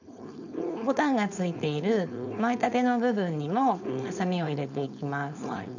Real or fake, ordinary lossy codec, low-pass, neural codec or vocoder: fake; none; 7.2 kHz; codec, 16 kHz, 4.8 kbps, FACodec